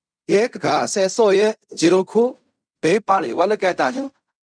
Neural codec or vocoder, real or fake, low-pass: codec, 16 kHz in and 24 kHz out, 0.4 kbps, LongCat-Audio-Codec, fine tuned four codebook decoder; fake; 9.9 kHz